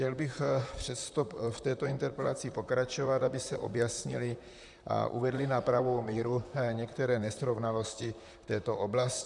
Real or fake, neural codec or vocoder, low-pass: fake; vocoder, 44.1 kHz, 128 mel bands, Pupu-Vocoder; 10.8 kHz